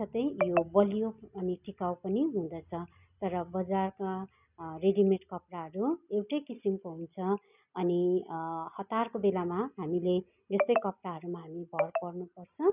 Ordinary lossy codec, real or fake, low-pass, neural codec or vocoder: none; real; 3.6 kHz; none